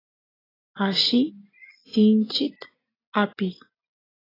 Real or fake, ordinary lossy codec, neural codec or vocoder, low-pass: real; AAC, 24 kbps; none; 5.4 kHz